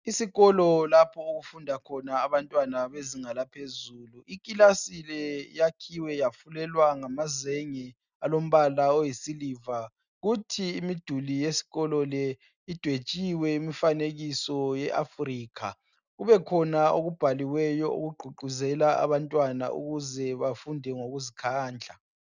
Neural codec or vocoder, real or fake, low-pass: none; real; 7.2 kHz